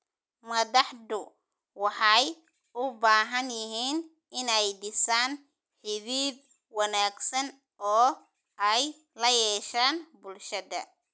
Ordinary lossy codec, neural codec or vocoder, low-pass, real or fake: none; none; none; real